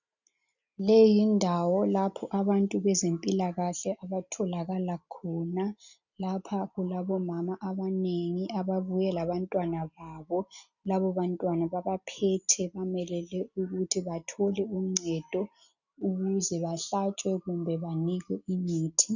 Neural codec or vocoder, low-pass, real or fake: none; 7.2 kHz; real